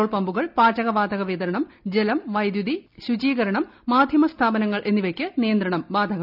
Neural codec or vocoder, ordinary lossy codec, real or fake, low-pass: none; none; real; 5.4 kHz